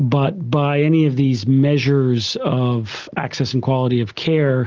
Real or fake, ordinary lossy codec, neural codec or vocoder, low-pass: real; Opus, 32 kbps; none; 7.2 kHz